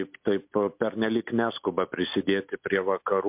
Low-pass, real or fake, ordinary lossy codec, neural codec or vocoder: 3.6 kHz; real; MP3, 32 kbps; none